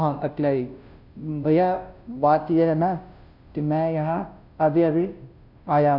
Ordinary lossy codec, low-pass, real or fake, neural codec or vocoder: none; 5.4 kHz; fake; codec, 16 kHz, 0.5 kbps, FunCodec, trained on Chinese and English, 25 frames a second